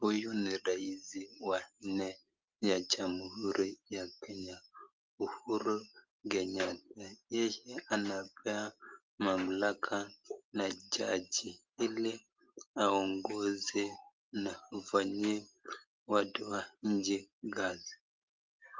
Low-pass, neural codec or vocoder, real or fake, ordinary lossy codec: 7.2 kHz; none; real; Opus, 24 kbps